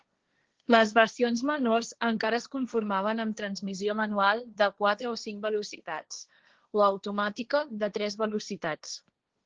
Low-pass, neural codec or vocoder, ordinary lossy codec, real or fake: 7.2 kHz; codec, 16 kHz, 1.1 kbps, Voila-Tokenizer; Opus, 16 kbps; fake